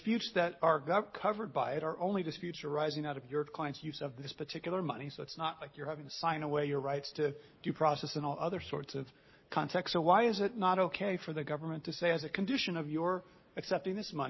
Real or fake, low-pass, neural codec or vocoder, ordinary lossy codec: real; 7.2 kHz; none; MP3, 24 kbps